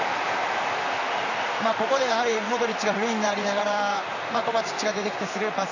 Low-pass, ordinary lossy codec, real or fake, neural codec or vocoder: 7.2 kHz; none; fake; vocoder, 44.1 kHz, 128 mel bands, Pupu-Vocoder